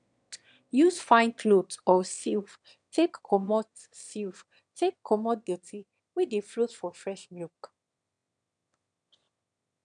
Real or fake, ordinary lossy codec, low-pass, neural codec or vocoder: fake; none; 9.9 kHz; autoencoder, 22.05 kHz, a latent of 192 numbers a frame, VITS, trained on one speaker